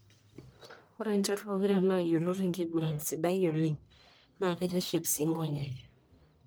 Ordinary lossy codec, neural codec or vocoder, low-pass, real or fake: none; codec, 44.1 kHz, 1.7 kbps, Pupu-Codec; none; fake